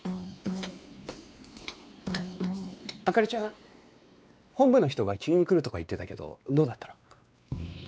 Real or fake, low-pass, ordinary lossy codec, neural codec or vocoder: fake; none; none; codec, 16 kHz, 2 kbps, X-Codec, WavLM features, trained on Multilingual LibriSpeech